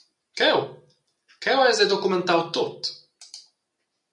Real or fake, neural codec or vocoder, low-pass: real; none; 10.8 kHz